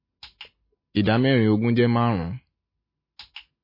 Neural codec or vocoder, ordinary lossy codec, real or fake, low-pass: none; MP3, 24 kbps; real; 5.4 kHz